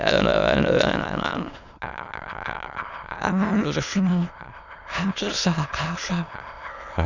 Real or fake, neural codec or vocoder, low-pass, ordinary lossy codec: fake; autoencoder, 22.05 kHz, a latent of 192 numbers a frame, VITS, trained on many speakers; 7.2 kHz; none